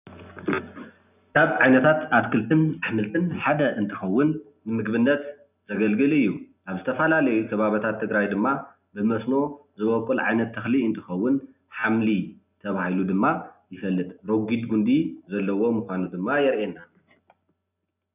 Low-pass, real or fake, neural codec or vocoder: 3.6 kHz; real; none